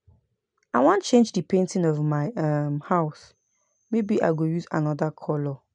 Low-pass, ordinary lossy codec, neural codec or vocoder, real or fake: 9.9 kHz; MP3, 96 kbps; none; real